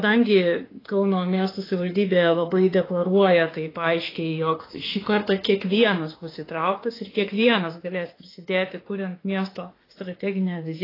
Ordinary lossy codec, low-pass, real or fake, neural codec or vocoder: AAC, 24 kbps; 5.4 kHz; fake; autoencoder, 48 kHz, 32 numbers a frame, DAC-VAE, trained on Japanese speech